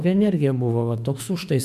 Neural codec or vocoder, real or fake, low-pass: autoencoder, 48 kHz, 32 numbers a frame, DAC-VAE, trained on Japanese speech; fake; 14.4 kHz